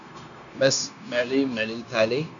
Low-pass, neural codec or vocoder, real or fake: 7.2 kHz; codec, 16 kHz, 0.9 kbps, LongCat-Audio-Codec; fake